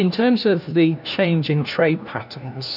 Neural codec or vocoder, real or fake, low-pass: codec, 16 kHz, 1 kbps, FunCodec, trained on LibriTTS, 50 frames a second; fake; 5.4 kHz